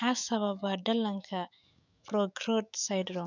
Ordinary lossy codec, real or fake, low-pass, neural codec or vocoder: none; fake; 7.2 kHz; autoencoder, 48 kHz, 128 numbers a frame, DAC-VAE, trained on Japanese speech